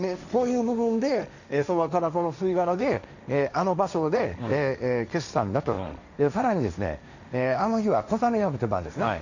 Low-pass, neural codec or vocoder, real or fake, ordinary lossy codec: 7.2 kHz; codec, 16 kHz, 1.1 kbps, Voila-Tokenizer; fake; none